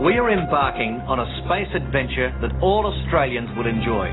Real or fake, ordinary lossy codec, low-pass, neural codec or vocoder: real; AAC, 16 kbps; 7.2 kHz; none